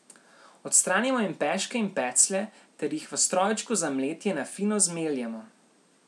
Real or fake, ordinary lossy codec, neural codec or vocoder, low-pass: real; none; none; none